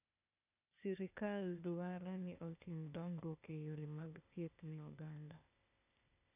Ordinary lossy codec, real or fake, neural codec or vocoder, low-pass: none; fake; codec, 16 kHz, 0.8 kbps, ZipCodec; 3.6 kHz